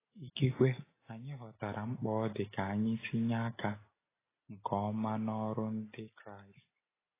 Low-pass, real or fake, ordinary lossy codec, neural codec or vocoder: 3.6 kHz; real; AAC, 16 kbps; none